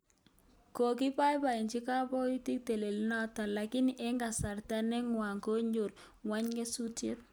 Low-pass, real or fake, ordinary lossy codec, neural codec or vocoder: none; real; none; none